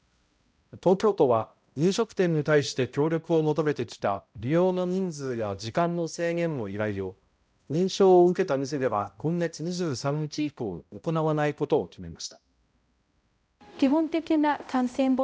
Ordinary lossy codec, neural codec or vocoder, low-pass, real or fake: none; codec, 16 kHz, 0.5 kbps, X-Codec, HuBERT features, trained on balanced general audio; none; fake